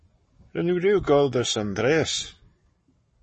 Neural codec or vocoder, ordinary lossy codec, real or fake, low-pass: codec, 44.1 kHz, 7.8 kbps, Pupu-Codec; MP3, 32 kbps; fake; 10.8 kHz